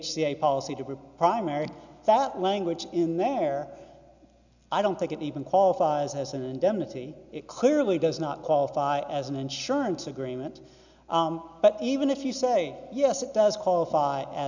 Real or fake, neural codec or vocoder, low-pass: real; none; 7.2 kHz